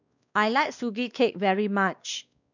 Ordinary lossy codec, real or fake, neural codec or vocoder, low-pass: none; fake; codec, 16 kHz, 2 kbps, X-Codec, WavLM features, trained on Multilingual LibriSpeech; 7.2 kHz